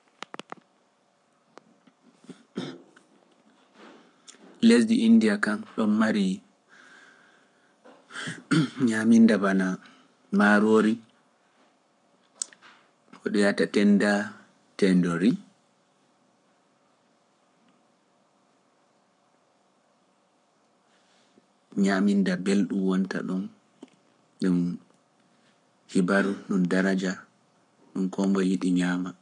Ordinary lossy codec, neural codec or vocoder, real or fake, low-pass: none; codec, 44.1 kHz, 7.8 kbps, Pupu-Codec; fake; 10.8 kHz